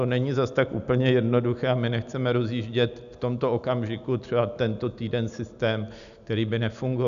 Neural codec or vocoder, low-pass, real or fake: none; 7.2 kHz; real